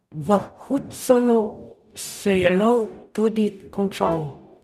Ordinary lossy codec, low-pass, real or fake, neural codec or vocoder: none; 14.4 kHz; fake; codec, 44.1 kHz, 0.9 kbps, DAC